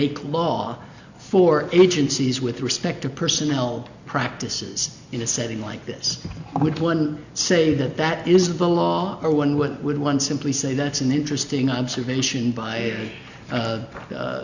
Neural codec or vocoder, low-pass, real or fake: none; 7.2 kHz; real